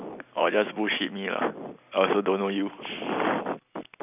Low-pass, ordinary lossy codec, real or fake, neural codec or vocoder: 3.6 kHz; none; real; none